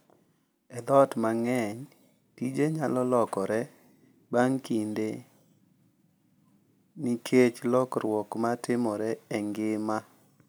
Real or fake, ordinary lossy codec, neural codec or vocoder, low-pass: real; none; none; none